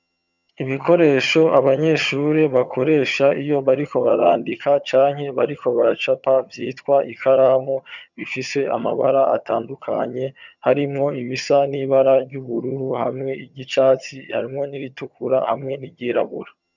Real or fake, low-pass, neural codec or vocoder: fake; 7.2 kHz; vocoder, 22.05 kHz, 80 mel bands, HiFi-GAN